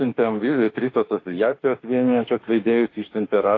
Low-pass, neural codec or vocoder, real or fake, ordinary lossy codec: 7.2 kHz; autoencoder, 48 kHz, 32 numbers a frame, DAC-VAE, trained on Japanese speech; fake; AAC, 32 kbps